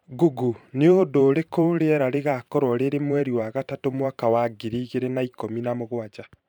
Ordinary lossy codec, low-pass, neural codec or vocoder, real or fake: none; 19.8 kHz; vocoder, 44.1 kHz, 128 mel bands every 512 samples, BigVGAN v2; fake